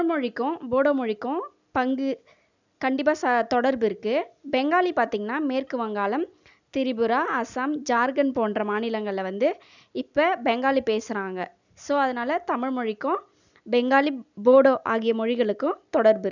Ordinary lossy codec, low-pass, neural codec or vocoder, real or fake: none; 7.2 kHz; none; real